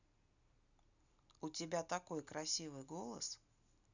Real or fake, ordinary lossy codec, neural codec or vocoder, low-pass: real; none; none; 7.2 kHz